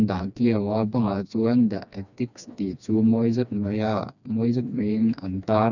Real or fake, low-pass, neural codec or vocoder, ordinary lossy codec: fake; 7.2 kHz; codec, 16 kHz, 2 kbps, FreqCodec, smaller model; none